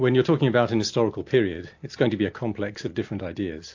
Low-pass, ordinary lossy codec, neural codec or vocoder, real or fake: 7.2 kHz; AAC, 48 kbps; none; real